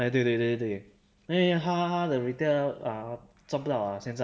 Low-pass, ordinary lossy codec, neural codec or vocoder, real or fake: none; none; none; real